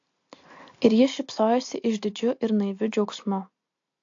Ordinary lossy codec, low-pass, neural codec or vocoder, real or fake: AAC, 48 kbps; 7.2 kHz; none; real